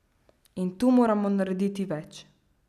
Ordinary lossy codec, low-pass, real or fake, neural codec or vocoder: none; 14.4 kHz; real; none